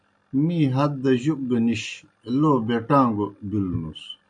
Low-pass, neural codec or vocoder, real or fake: 9.9 kHz; none; real